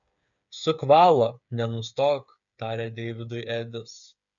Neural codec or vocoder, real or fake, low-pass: codec, 16 kHz, 8 kbps, FreqCodec, smaller model; fake; 7.2 kHz